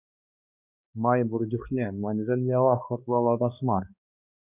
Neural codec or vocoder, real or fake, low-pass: codec, 16 kHz, 2 kbps, X-Codec, HuBERT features, trained on balanced general audio; fake; 3.6 kHz